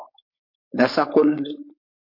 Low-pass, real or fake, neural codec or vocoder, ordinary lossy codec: 5.4 kHz; fake; codec, 16 kHz, 4.8 kbps, FACodec; MP3, 32 kbps